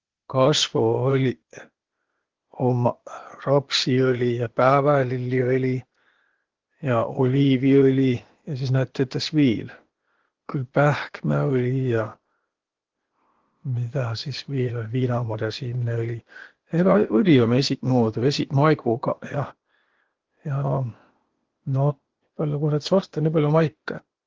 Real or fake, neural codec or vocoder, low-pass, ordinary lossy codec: fake; codec, 16 kHz, 0.8 kbps, ZipCodec; 7.2 kHz; Opus, 16 kbps